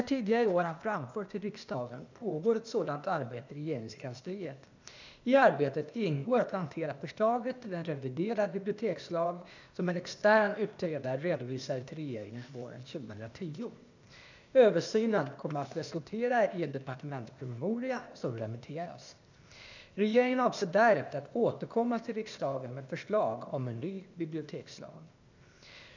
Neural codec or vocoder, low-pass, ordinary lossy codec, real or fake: codec, 16 kHz, 0.8 kbps, ZipCodec; 7.2 kHz; none; fake